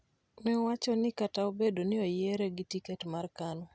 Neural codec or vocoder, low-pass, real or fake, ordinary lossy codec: none; none; real; none